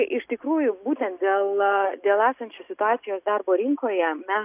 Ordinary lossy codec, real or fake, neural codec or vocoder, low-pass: AAC, 32 kbps; real; none; 3.6 kHz